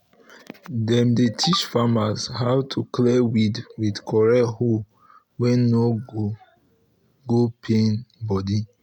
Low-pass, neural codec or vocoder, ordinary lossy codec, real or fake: 19.8 kHz; none; none; real